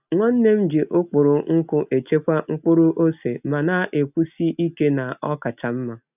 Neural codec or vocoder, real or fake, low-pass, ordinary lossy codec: none; real; 3.6 kHz; none